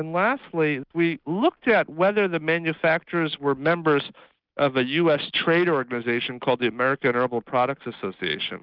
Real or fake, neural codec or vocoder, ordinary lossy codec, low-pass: real; none; Opus, 24 kbps; 5.4 kHz